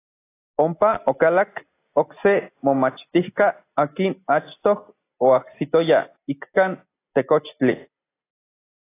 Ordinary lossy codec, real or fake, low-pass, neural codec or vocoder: AAC, 24 kbps; real; 3.6 kHz; none